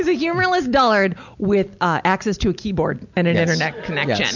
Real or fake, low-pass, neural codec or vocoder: real; 7.2 kHz; none